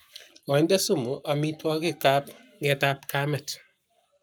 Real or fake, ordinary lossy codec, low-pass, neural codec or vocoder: fake; none; none; codec, 44.1 kHz, 7.8 kbps, Pupu-Codec